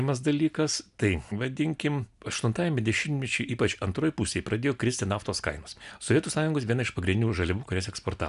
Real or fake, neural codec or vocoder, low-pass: real; none; 10.8 kHz